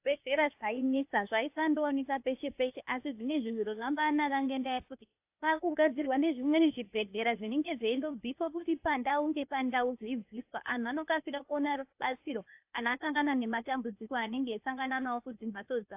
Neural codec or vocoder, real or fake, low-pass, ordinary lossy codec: codec, 16 kHz, 0.8 kbps, ZipCodec; fake; 3.6 kHz; AAC, 32 kbps